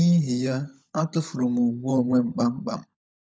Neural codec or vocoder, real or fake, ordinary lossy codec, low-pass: codec, 16 kHz, 16 kbps, FunCodec, trained on LibriTTS, 50 frames a second; fake; none; none